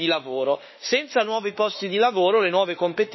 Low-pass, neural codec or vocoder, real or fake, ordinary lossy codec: 7.2 kHz; autoencoder, 48 kHz, 32 numbers a frame, DAC-VAE, trained on Japanese speech; fake; MP3, 24 kbps